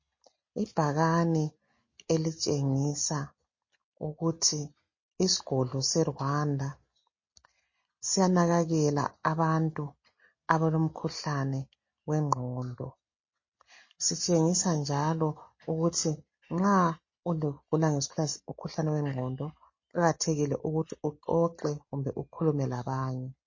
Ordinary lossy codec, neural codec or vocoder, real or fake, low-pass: MP3, 32 kbps; none; real; 7.2 kHz